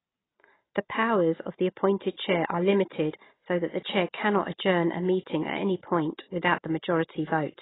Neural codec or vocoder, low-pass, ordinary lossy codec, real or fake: none; 7.2 kHz; AAC, 16 kbps; real